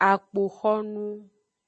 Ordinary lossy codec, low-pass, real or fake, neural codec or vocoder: MP3, 32 kbps; 10.8 kHz; real; none